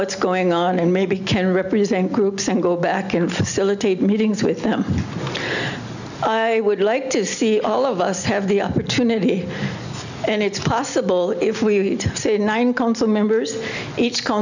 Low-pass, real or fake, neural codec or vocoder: 7.2 kHz; real; none